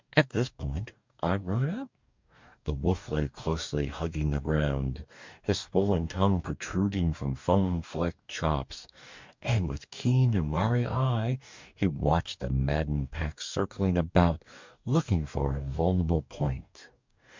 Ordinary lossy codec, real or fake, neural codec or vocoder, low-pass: MP3, 64 kbps; fake; codec, 44.1 kHz, 2.6 kbps, DAC; 7.2 kHz